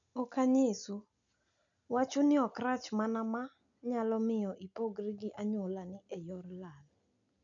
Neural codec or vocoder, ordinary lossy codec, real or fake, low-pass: none; none; real; 7.2 kHz